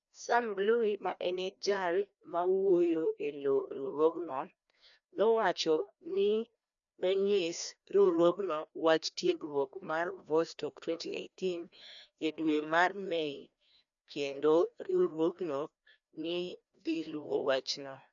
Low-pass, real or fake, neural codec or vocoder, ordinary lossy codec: 7.2 kHz; fake; codec, 16 kHz, 1 kbps, FreqCodec, larger model; none